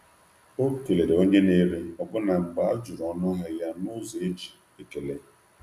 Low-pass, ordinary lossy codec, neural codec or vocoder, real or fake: 14.4 kHz; none; none; real